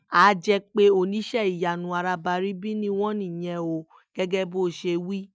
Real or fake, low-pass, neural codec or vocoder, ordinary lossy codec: real; none; none; none